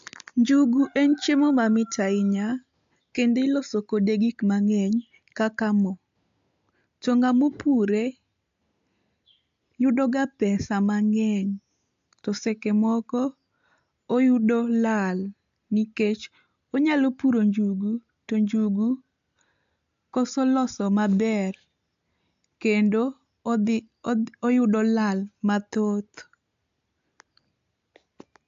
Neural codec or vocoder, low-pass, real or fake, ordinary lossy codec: none; 7.2 kHz; real; AAC, 64 kbps